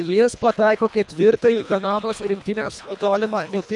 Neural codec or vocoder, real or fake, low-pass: codec, 24 kHz, 1.5 kbps, HILCodec; fake; 10.8 kHz